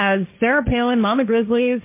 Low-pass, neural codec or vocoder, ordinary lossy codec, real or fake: 3.6 kHz; codec, 16 kHz, 1.1 kbps, Voila-Tokenizer; MP3, 24 kbps; fake